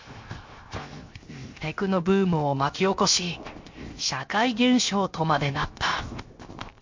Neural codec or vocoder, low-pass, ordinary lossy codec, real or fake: codec, 16 kHz, 0.7 kbps, FocalCodec; 7.2 kHz; MP3, 48 kbps; fake